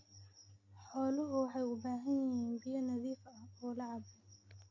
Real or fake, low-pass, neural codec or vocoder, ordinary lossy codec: real; 7.2 kHz; none; MP3, 32 kbps